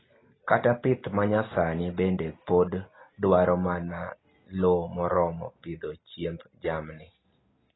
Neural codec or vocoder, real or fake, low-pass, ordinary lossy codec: none; real; 7.2 kHz; AAC, 16 kbps